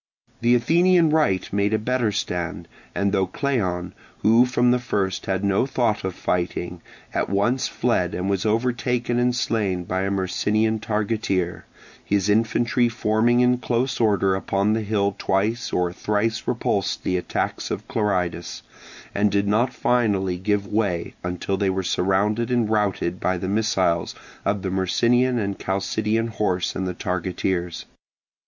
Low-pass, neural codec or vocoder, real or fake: 7.2 kHz; none; real